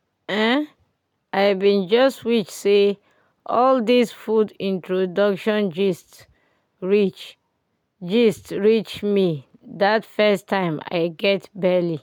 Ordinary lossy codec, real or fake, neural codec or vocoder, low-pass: none; real; none; 19.8 kHz